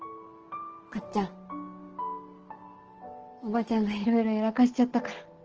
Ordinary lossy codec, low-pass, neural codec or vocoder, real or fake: Opus, 16 kbps; 7.2 kHz; none; real